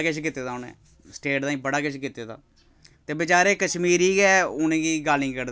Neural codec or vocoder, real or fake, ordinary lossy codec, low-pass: none; real; none; none